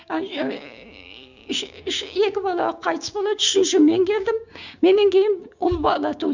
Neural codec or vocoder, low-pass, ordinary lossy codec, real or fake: codec, 16 kHz, 6 kbps, DAC; 7.2 kHz; Opus, 64 kbps; fake